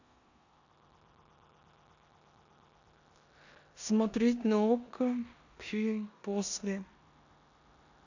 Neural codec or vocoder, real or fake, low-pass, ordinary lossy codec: codec, 16 kHz in and 24 kHz out, 0.9 kbps, LongCat-Audio-Codec, four codebook decoder; fake; 7.2 kHz; none